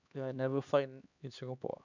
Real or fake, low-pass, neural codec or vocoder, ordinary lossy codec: fake; 7.2 kHz; codec, 16 kHz, 2 kbps, X-Codec, HuBERT features, trained on LibriSpeech; none